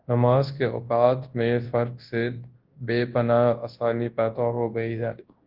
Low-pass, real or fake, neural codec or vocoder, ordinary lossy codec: 5.4 kHz; fake; codec, 24 kHz, 0.9 kbps, WavTokenizer, large speech release; Opus, 16 kbps